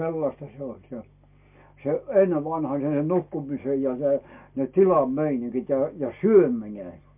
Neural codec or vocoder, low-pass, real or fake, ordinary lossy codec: none; 3.6 kHz; real; MP3, 32 kbps